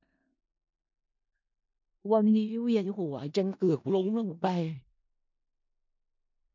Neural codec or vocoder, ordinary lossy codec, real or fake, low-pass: codec, 16 kHz in and 24 kHz out, 0.4 kbps, LongCat-Audio-Codec, four codebook decoder; MP3, 64 kbps; fake; 7.2 kHz